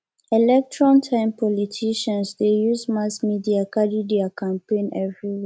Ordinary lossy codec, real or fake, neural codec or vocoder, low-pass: none; real; none; none